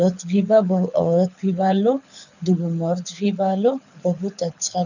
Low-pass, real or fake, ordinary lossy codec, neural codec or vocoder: 7.2 kHz; fake; none; codec, 24 kHz, 6 kbps, HILCodec